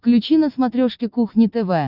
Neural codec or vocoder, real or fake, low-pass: none; real; 5.4 kHz